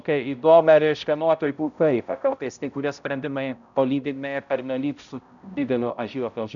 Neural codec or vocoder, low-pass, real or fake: codec, 16 kHz, 0.5 kbps, X-Codec, HuBERT features, trained on balanced general audio; 7.2 kHz; fake